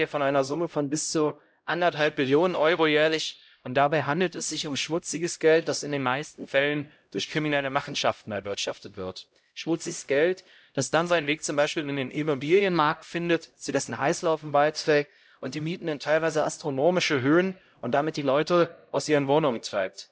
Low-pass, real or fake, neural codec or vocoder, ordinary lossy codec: none; fake; codec, 16 kHz, 0.5 kbps, X-Codec, HuBERT features, trained on LibriSpeech; none